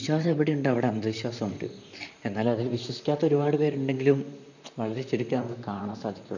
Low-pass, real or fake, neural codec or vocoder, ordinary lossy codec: 7.2 kHz; fake; vocoder, 44.1 kHz, 128 mel bands, Pupu-Vocoder; none